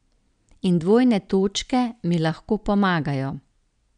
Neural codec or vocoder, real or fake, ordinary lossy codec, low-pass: none; real; none; 9.9 kHz